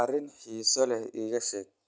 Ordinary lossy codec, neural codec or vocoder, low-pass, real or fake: none; none; none; real